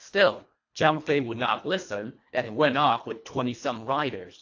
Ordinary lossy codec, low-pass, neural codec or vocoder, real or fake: AAC, 48 kbps; 7.2 kHz; codec, 24 kHz, 1.5 kbps, HILCodec; fake